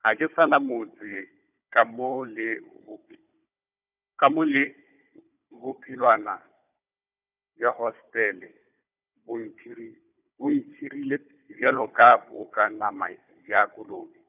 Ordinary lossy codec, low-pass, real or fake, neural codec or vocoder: none; 3.6 kHz; fake; codec, 16 kHz, 4 kbps, FunCodec, trained on Chinese and English, 50 frames a second